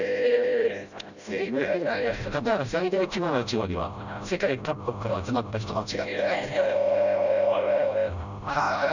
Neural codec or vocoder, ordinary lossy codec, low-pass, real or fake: codec, 16 kHz, 0.5 kbps, FreqCodec, smaller model; none; 7.2 kHz; fake